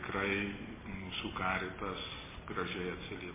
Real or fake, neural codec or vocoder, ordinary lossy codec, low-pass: real; none; MP3, 16 kbps; 3.6 kHz